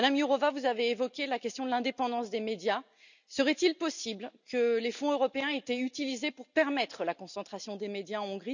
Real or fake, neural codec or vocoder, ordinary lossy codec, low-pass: real; none; none; 7.2 kHz